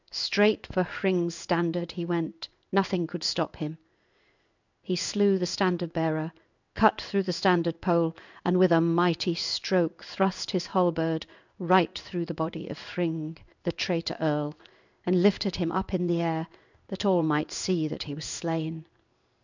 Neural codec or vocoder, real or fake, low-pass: codec, 16 kHz in and 24 kHz out, 1 kbps, XY-Tokenizer; fake; 7.2 kHz